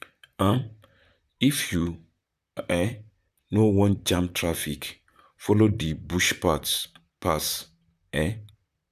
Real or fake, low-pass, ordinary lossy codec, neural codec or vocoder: fake; 14.4 kHz; none; vocoder, 44.1 kHz, 128 mel bands, Pupu-Vocoder